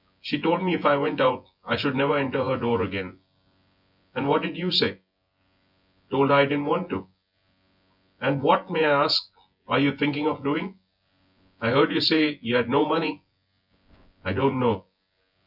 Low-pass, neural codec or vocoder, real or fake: 5.4 kHz; vocoder, 24 kHz, 100 mel bands, Vocos; fake